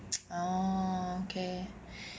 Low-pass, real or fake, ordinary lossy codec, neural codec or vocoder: none; real; none; none